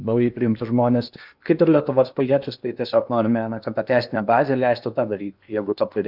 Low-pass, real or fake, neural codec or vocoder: 5.4 kHz; fake; codec, 16 kHz in and 24 kHz out, 0.8 kbps, FocalCodec, streaming, 65536 codes